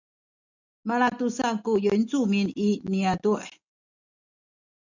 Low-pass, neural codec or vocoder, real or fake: 7.2 kHz; none; real